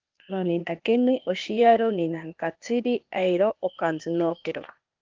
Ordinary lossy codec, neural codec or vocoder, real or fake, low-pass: Opus, 32 kbps; codec, 16 kHz, 0.8 kbps, ZipCodec; fake; 7.2 kHz